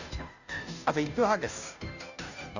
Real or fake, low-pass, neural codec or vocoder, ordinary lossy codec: fake; 7.2 kHz; codec, 16 kHz, 0.5 kbps, FunCodec, trained on Chinese and English, 25 frames a second; none